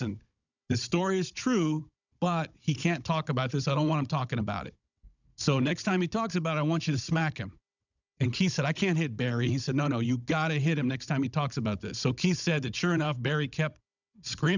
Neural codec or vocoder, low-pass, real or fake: codec, 16 kHz, 16 kbps, FunCodec, trained on Chinese and English, 50 frames a second; 7.2 kHz; fake